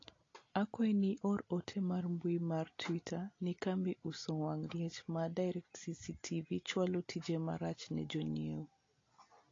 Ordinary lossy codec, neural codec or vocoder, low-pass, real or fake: AAC, 32 kbps; none; 7.2 kHz; real